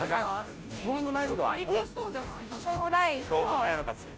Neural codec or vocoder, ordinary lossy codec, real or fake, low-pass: codec, 16 kHz, 0.5 kbps, FunCodec, trained on Chinese and English, 25 frames a second; none; fake; none